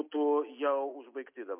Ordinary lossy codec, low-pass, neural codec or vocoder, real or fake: MP3, 32 kbps; 3.6 kHz; none; real